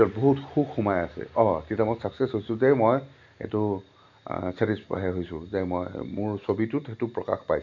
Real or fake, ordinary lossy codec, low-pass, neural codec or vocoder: real; none; 7.2 kHz; none